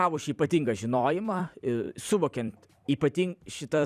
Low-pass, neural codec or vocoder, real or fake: 14.4 kHz; vocoder, 44.1 kHz, 128 mel bands every 256 samples, BigVGAN v2; fake